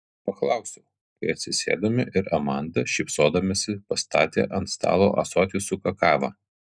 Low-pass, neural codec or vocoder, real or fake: 9.9 kHz; none; real